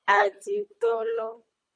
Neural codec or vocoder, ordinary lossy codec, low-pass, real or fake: codec, 24 kHz, 3 kbps, HILCodec; MP3, 48 kbps; 9.9 kHz; fake